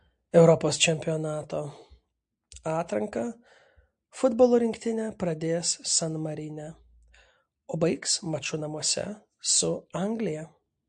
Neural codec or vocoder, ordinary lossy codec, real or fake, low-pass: none; MP3, 48 kbps; real; 9.9 kHz